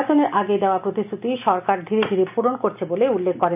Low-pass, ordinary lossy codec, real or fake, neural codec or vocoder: 3.6 kHz; none; real; none